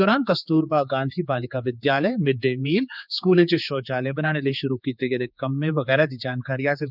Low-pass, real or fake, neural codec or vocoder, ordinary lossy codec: 5.4 kHz; fake; codec, 16 kHz, 4 kbps, X-Codec, HuBERT features, trained on general audio; none